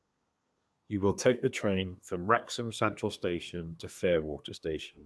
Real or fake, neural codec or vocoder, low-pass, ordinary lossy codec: fake; codec, 24 kHz, 1 kbps, SNAC; none; none